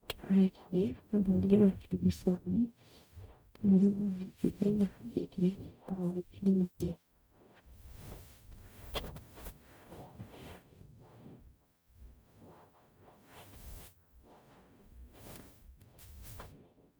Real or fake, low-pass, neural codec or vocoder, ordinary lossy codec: fake; none; codec, 44.1 kHz, 0.9 kbps, DAC; none